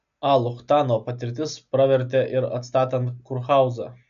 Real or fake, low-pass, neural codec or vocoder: real; 7.2 kHz; none